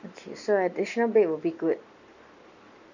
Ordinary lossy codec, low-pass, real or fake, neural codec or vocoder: none; 7.2 kHz; real; none